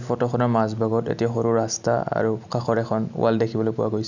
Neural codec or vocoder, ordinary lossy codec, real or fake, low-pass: none; none; real; 7.2 kHz